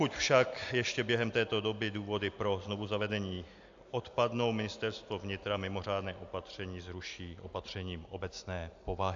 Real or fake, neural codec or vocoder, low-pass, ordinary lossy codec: real; none; 7.2 kHz; AAC, 64 kbps